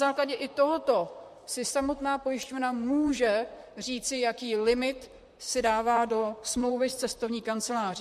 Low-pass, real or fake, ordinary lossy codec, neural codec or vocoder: 14.4 kHz; fake; MP3, 64 kbps; vocoder, 44.1 kHz, 128 mel bands, Pupu-Vocoder